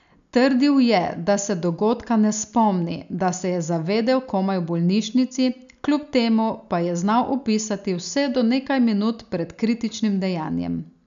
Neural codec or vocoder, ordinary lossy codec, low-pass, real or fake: none; none; 7.2 kHz; real